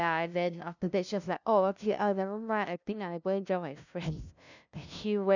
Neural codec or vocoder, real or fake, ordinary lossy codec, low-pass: codec, 16 kHz, 0.5 kbps, FunCodec, trained on LibriTTS, 25 frames a second; fake; none; 7.2 kHz